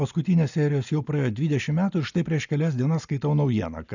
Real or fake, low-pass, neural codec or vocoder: real; 7.2 kHz; none